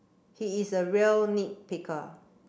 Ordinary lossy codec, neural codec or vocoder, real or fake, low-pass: none; none; real; none